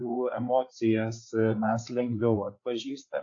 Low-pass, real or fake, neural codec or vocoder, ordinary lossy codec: 7.2 kHz; fake; codec, 16 kHz, 4 kbps, FreqCodec, larger model; AAC, 48 kbps